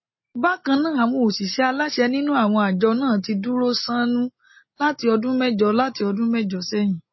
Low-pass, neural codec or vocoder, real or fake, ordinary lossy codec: 7.2 kHz; none; real; MP3, 24 kbps